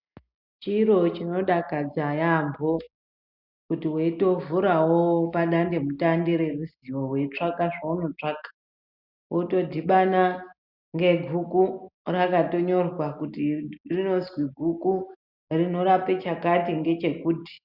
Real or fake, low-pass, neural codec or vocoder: real; 5.4 kHz; none